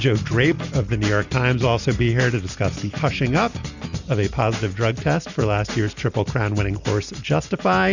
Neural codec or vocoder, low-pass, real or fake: none; 7.2 kHz; real